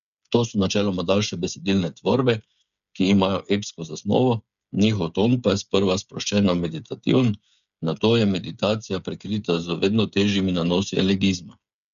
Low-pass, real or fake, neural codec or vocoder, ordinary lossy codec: 7.2 kHz; fake; codec, 16 kHz, 8 kbps, FreqCodec, smaller model; none